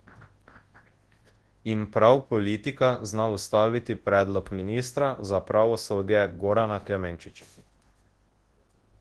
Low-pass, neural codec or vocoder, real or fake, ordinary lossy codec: 10.8 kHz; codec, 24 kHz, 0.9 kbps, WavTokenizer, large speech release; fake; Opus, 16 kbps